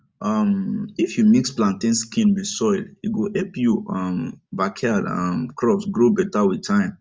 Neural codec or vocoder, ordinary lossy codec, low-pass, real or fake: none; none; none; real